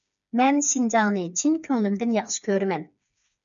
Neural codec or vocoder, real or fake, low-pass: codec, 16 kHz, 4 kbps, FreqCodec, smaller model; fake; 7.2 kHz